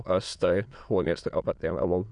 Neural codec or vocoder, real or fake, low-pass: autoencoder, 22.05 kHz, a latent of 192 numbers a frame, VITS, trained on many speakers; fake; 9.9 kHz